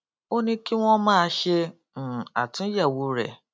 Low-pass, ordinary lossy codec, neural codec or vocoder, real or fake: none; none; none; real